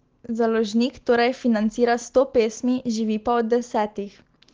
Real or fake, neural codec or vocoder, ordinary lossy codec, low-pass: real; none; Opus, 16 kbps; 7.2 kHz